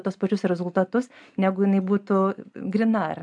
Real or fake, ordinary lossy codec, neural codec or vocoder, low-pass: real; MP3, 96 kbps; none; 10.8 kHz